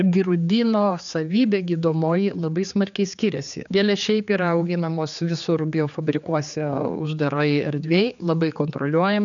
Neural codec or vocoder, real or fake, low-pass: codec, 16 kHz, 4 kbps, X-Codec, HuBERT features, trained on general audio; fake; 7.2 kHz